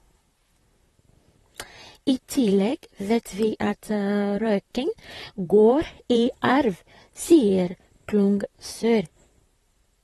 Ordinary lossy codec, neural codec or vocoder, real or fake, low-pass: AAC, 32 kbps; vocoder, 44.1 kHz, 128 mel bands, Pupu-Vocoder; fake; 19.8 kHz